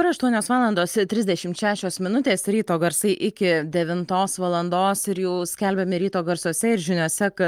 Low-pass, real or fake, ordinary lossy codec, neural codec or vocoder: 19.8 kHz; real; Opus, 32 kbps; none